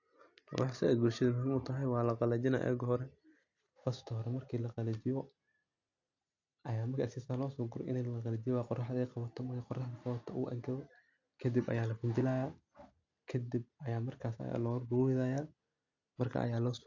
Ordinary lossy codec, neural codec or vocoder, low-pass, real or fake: AAC, 48 kbps; none; 7.2 kHz; real